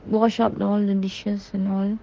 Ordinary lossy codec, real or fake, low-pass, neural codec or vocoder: Opus, 16 kbps; fake; 7.2 kHz; autoencoder, 48 kHz, 32 numbers a frame, DAC-VAE, trained on Japanese speech